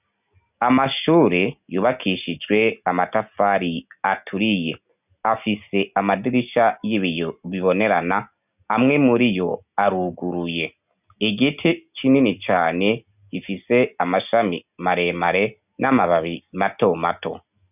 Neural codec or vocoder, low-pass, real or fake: none; 3.6 kHz; real